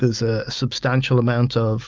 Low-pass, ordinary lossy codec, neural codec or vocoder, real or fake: 7.2 kHz; Opus, 24 kbps; none; real